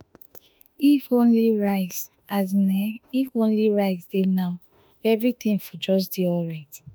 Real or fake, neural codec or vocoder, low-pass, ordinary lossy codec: fake; autoencoder, 48 kHz, 32 numbers a frame, DAC-VAE, trained on Japanese speech; none; none